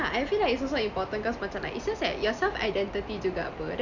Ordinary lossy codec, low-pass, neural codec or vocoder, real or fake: none; 7.2 kHz; none; real